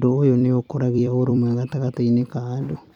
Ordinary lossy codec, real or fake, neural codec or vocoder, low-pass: none; fake; vocoder, 44.1 kHz, 128 mel bands every 256 samples, BigVGAN v2; 19.8 kHz